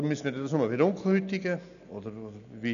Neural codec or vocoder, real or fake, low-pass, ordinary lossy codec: none; real; 7.2 kHz; none